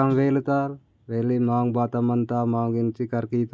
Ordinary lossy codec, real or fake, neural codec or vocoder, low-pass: none; real; none; none